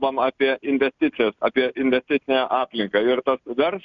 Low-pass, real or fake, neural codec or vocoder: 7.2 kHz; real; none